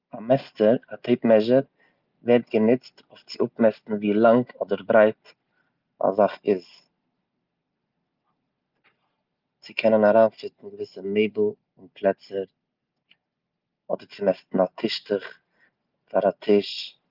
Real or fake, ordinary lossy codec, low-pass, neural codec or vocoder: real; Opus, 32 kbps; 5.4 kHz; none